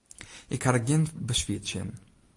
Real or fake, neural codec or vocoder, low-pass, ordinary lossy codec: real; none; 10.8 kHz; AAC, 64 kbps